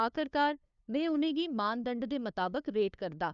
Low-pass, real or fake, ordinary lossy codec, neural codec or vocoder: 7.2 kHz; fake; none; codec, 16 kHz, 2 kbps, FunCodec, trained on LibriTTS, 25 frames a second